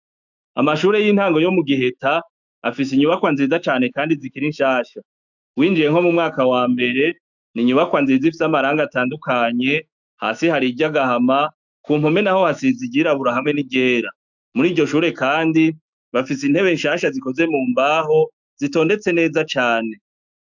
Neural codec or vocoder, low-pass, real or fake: autoencoder, 48 kHz, 128 numbers a frame, DAC-VAE, trained on Japanese speech; 7.2 kHz; fake